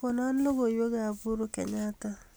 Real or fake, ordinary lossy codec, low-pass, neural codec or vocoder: real; none; none; none